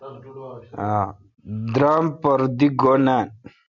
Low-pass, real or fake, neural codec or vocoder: 7.2 kHz; real; none